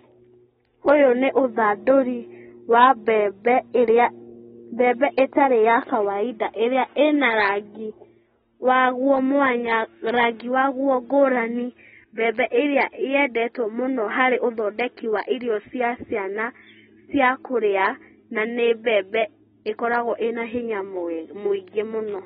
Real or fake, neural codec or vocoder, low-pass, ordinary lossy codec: real; none; 10.8 kHz; AAC, 16 kbps